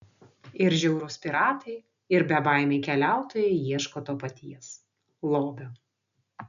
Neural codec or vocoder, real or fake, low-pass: none; real; 7.2 kHz